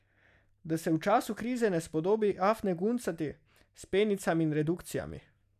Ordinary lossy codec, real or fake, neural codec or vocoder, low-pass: none; real; none; 14.4 kHz